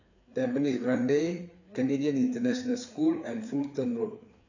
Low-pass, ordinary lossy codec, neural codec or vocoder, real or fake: 7.2 kHz; none; codec, 16 kHz, 4 kbps, FreqCodec, larger model; fake